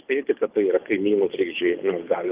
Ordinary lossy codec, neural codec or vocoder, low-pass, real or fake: Opus, 32 kbps; codec, 24 kHz, 6 kbps, HILCodec; 3.6 kHz; fake